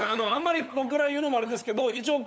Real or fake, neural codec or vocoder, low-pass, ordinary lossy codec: fake; codec, 16 kHz, 8 kbps, FunCodec, trained on LibriTTS, 25 frames a second; none; none